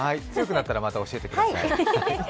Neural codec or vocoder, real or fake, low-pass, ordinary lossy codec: none; real; none; none